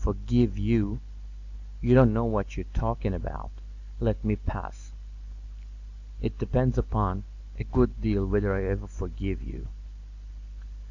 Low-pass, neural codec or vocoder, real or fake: 7.2 kHz; none; real